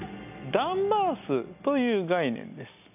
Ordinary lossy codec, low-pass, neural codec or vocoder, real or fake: none; 3.6 kHz; none; real